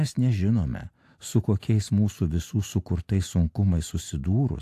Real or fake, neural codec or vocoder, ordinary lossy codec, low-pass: real; none; AAC, 64 kbps; 14.4 kHz